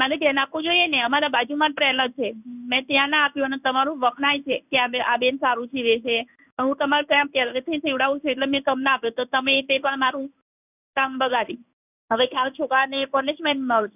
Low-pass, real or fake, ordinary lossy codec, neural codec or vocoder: 3.6 kHz; fake; none; codec, 16 kHz in and 24 kHz out, 1 kbps, XY-Tokenizer